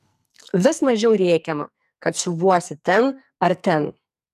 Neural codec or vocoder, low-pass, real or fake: codec, 44.1 kHz, 2.6 kbps, SNAC; 14.4 kHz; fake